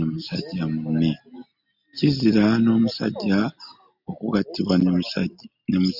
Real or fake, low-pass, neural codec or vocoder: real; 5.4 kHz; none